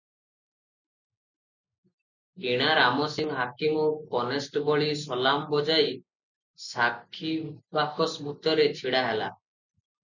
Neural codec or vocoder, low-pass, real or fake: none; 7.2 kHz; real